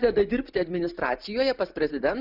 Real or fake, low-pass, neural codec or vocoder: real; 5.4 kHz; none